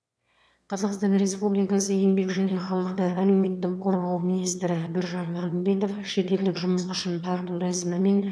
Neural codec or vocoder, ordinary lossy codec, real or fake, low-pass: autoencoder, 22.05 kHz, a latent of 192 numbers a frame, VITS, trained on one speaker; none; fake; none